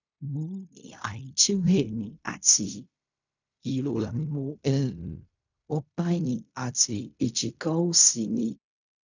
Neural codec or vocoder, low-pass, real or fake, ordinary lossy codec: codec, 16 kHz in and 24 kHz out, 0.4 kbps, LongCat-Audio-Codec, fine tuned four codebook decoder; 7.2 kHz; fake; none